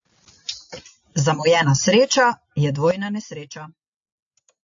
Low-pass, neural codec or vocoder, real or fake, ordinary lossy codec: 7.2 kHz; none; real; AAC, 64 kbps